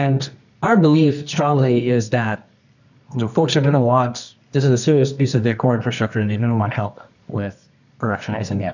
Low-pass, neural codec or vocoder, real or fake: 7.2 kHz; codec, 24 kHz, 0.9 kbps, WavTokenizer, medium music audio release; fake